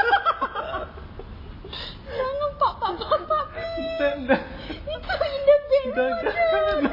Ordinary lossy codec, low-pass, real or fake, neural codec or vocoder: MP3, 24 kbps; 5.4 kHz; real; none